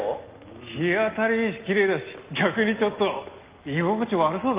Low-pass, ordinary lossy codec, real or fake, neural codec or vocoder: 3.6 kHz; Opus, 32 kbps; real; none